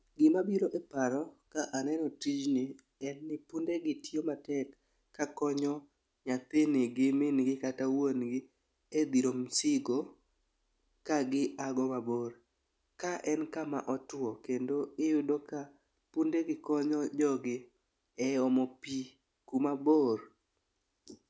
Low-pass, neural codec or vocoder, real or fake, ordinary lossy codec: none; none; real; none